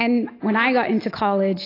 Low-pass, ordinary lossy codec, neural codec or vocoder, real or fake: 5.4 kHz; AAC, 24 kbps; none; real